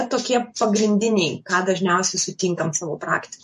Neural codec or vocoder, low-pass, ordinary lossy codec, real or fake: vocoder, 48 kHz, 128 mel bands, Vocos; 14.4 kHz; MP3, 48 kbps; fake